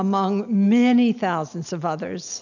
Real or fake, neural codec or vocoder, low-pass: real; none; 7.2 kHz